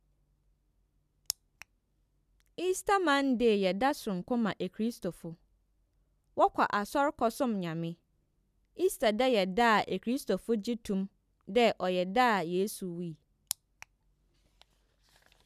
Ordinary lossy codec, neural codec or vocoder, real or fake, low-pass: none; none; real; 14.4 kHz